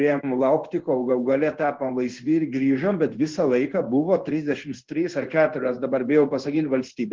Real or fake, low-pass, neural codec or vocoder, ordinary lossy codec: fake; 7.2 kHz; codec, 16 kHz in and 24 kHz out, 1 kbps, XY-Tokenizer; Opus, 16 kbps